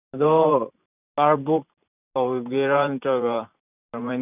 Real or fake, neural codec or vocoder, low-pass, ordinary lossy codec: fake; vocoder, 44.1 kHz, 128 mel bands every 512 samples, BigVGAN v2; 3.6 kHz; none